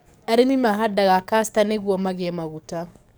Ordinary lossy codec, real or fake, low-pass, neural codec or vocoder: none; fake; none; codec, 44.1 kHz, 7.8 kbps, DAC